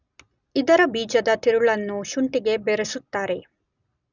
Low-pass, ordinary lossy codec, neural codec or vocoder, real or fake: 7.2 kHz; none; none; real